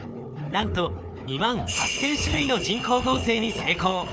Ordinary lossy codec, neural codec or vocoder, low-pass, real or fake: none; codec, 16 kHz, 4 kbps, FunCodec, trained on Chinese and English, 50 frames a second; none; fake